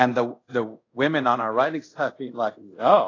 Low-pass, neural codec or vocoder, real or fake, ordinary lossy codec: 7.2 kHz; codec, 24 kHz, 0.5 kbps, DualCodec; fake; AAC, 32 kbps